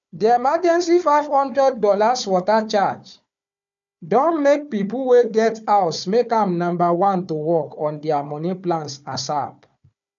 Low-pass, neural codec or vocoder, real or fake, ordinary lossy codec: 7.2 kHz; codec, 16 kHz, 4 kbps, FunCodec, trained on Chinese and English, 50 frames a second; fake; none